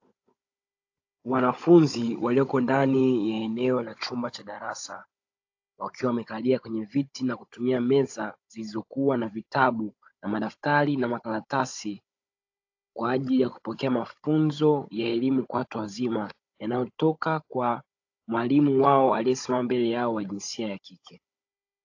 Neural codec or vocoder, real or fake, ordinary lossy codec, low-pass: codec, 16 kHz, 16 kbps, FunCodec, trained on Chinese and English, 50 frames a second; fake; AAC, 48 kbps; 7.2 kHz